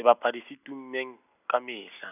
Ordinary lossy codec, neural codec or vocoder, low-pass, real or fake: none; none; 3.6 kHz; real